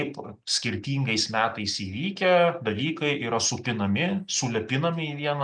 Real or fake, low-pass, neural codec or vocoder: real; 9.9 kHz; none